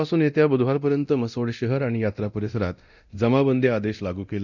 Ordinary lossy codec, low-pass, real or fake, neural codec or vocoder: none; 7.2 kHz; fake; codec, 24 kHz, 0.9 kbps, DualCodec